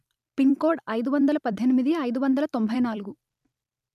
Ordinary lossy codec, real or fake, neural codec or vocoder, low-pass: none; fake; vocoder, 44.1 kHz, 128 mel bands every 256 samples, BigVGAN v2; 14.4 kHz